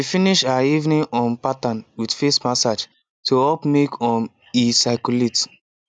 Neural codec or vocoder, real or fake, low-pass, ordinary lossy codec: none; real; 9.9 kHz; none